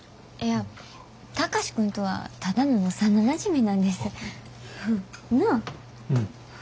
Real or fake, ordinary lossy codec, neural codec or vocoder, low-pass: real; none; none; none